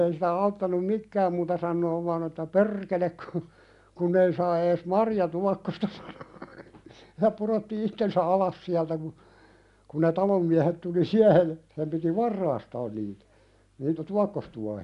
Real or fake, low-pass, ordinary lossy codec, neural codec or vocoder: real; 10.8 kHz; none; none